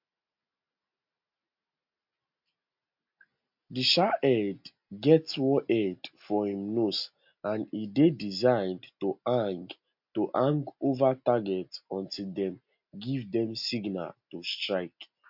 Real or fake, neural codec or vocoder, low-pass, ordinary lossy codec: real; none; 5.4 kHz; MP3, 48 kbps